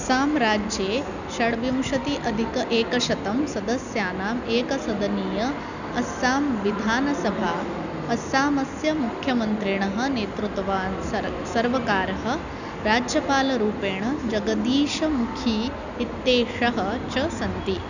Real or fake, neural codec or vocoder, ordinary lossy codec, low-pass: real; none; none; 7.2 kHz